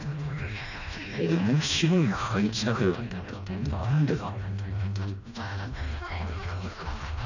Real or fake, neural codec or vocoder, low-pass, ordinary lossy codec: fake; codec, 16 kHz, 1 kbps, FreqCodec, smaller model; 7.2 kHz; none